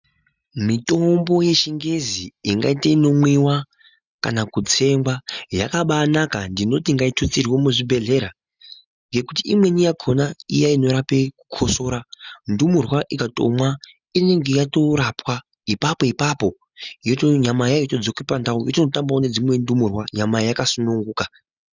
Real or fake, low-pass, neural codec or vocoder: real; 7.2 kHz; none